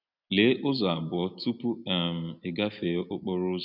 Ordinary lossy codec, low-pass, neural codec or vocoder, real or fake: none; 5.4 kHz; none; real